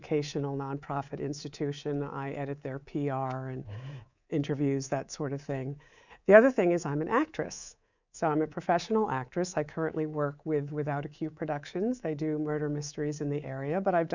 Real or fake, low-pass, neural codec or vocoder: fake; 7.2 kHz; codec, 24 kHz, 3.1 kbps, DualCodec